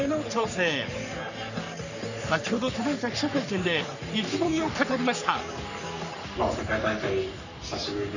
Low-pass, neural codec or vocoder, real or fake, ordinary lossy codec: 7.2 kHz; codec, 44.1 kHz, 3.4 kbps, Pupu-Codec; fake; none